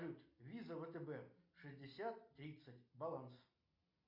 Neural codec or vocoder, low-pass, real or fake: none; 5.4 kHz; real